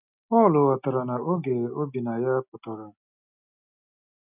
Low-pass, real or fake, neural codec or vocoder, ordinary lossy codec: 3.6 kHz; real; none; none